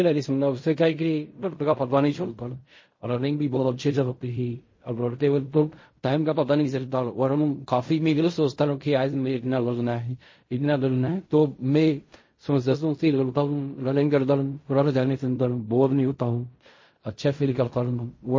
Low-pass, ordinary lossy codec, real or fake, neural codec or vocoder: 7.2 kHz; MP3, 32 kbps; fake; codec, 16 kHz in and 24 kHz out, 0.4 kbps, LongCat-Audio-Codec, fine tuned four codebook decoder